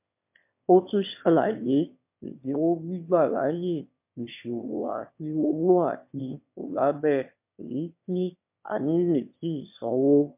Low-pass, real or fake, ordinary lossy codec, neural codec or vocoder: 3.6 kHz; fake; MP3, 32 kbps; autoencoder, 22.05 kHz, a latent of 192 numbers a frame, VITS, trained on one speaker